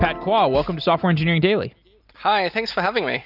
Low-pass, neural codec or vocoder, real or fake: 5.4 kHz; none; real